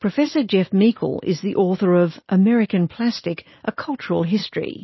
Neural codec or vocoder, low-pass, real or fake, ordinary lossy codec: none; 7.2 kHz; real; MP3, 24 kbps